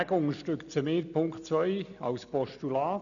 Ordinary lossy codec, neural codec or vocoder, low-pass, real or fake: none; none; 7.2 kHz; real